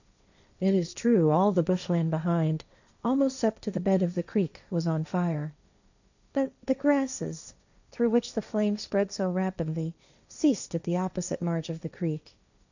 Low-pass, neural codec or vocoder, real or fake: 7.2 kHz; codec, 16 kHz, 1.1 kbps, Voila-Tokenizer; fake